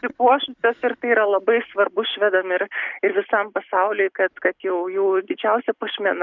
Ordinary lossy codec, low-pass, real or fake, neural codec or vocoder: Opus, 64 kbps; 7.2 kHz; real; none